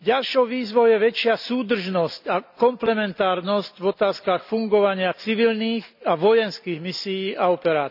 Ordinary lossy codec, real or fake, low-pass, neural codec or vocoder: none; real; 5.4 kHz; none